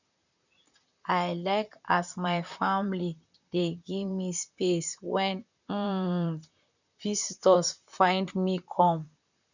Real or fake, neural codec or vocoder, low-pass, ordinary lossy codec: fake; vocoder, 44.1 kHz, 128 mel bands, Pupu-Vocoder; 7.2 kHz; none